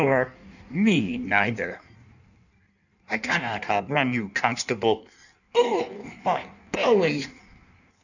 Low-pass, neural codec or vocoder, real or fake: 7.2 kHz; codec, 16 kHz in and 24 kHz out, 1.1 kbps, FireRedTTS-2 codec; fake